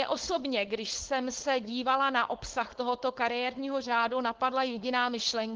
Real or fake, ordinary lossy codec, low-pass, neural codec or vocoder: fake; Opus, 16 kbps; 7.2 kHz; codec, 16 kHz, 4.8 kbps, FACodec